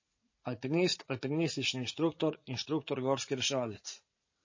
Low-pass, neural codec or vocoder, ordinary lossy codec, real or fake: 7.2 kHz; vocoder, 44.1 kHz, 80 mel bands, Vocos; MP3, 32 kbps; fake